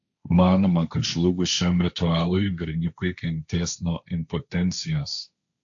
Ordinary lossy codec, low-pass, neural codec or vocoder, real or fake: AAC, 64 kbps; 7.2 kHz; codec, 16 kHz, 1.1 kbps, Voila-Tokenizer; fake